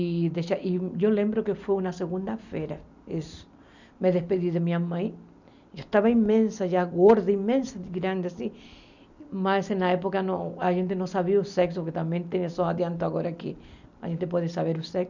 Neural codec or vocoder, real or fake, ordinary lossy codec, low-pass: none; real; none; 7.2 kHz